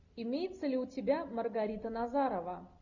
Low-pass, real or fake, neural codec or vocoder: 7.2 kHz; real; none